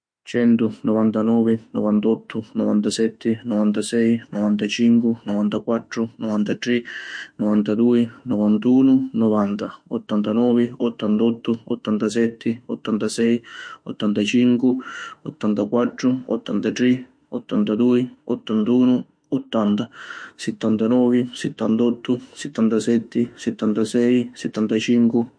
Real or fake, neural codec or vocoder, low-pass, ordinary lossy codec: fake; autoencoder, 48 kHz, 32 numbers a frame, DAC-VAE, trained on Japanese speech; 9.9 kHz; MP3, 48 kbps